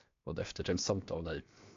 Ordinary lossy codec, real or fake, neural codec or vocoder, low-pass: AAC, 48 kbps; fake; codec, 16 kHz, 0.7 kbps, FocalCodec; 7.2 kHz